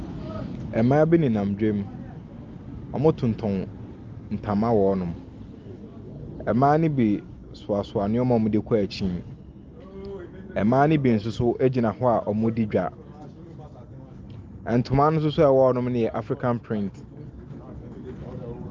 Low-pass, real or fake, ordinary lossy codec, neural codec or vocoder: 7.2 kHz; real; Opus, 24 kbps; none